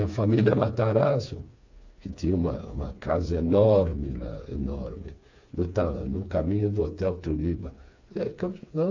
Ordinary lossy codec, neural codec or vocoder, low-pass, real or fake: AAC, 48 kbps; codec, 16 kHz, 4 kbps, FreqCodec, smaller model; 7.2 kHz; fake